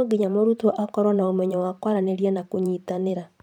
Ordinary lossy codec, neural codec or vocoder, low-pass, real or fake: none; vocoder, 44.1 kHz, 128 mel bands every 512 samples, BigVGAN v2; 19.8 kHz; fake